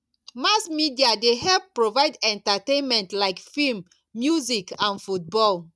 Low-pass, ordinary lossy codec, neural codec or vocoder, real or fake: none; none; none; real